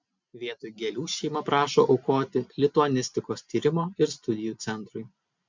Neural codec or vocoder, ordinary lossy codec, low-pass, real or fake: none; MP3, 64 kbps; 7.2 kHz; real